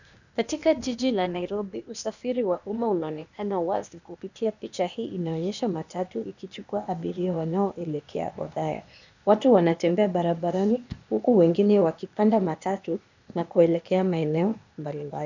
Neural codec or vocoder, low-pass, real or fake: codec, 16 kHz, 0.8 kbps, ZipCodec; 7.2 kHz; fake